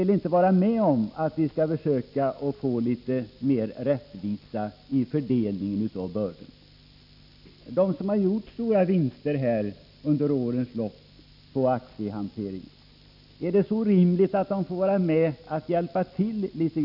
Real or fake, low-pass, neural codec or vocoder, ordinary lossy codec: real; 5.4 kHz; none; none